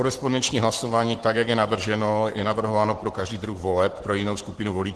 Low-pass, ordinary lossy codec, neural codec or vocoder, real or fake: 10.8 kHz; Opus, 16 kbps; codec, 44.1 kHz, 7.8 kbps, Pupu-Codec; fake